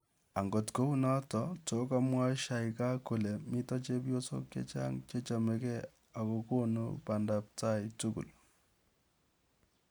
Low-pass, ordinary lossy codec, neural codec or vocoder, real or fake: none; none; none; real